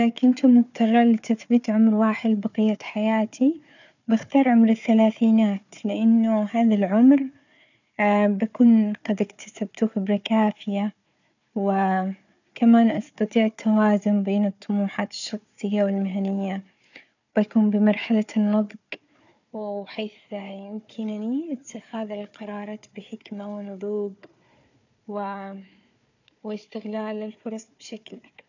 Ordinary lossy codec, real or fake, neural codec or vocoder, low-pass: none; fake; codec, 16 kHz, 4 kbps, FunCodec, trained on Chinese and English, 50 frames a second; 7.2 kHz